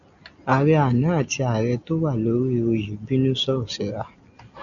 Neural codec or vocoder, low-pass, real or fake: none; 7.2 kHz; real